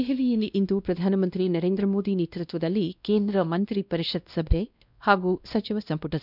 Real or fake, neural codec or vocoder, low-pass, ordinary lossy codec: fake; codec, 16 kHz, 1 kbps, X-Codec, WavLM features, trained on Multilingual LibriSpeech; 5.4 kHz; none